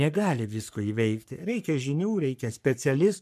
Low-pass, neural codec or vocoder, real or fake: 14.4 kHz; codec, 44.1 kHz, 3.4 kbps, Pupu-Codec; fake